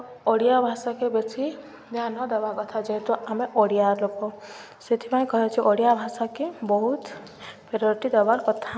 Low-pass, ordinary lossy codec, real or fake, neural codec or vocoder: none; none; real; none